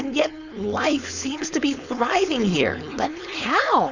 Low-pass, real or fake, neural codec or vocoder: 7.2 kHz; fake; codec, 16 kHz, 4.8 kbps, FACodec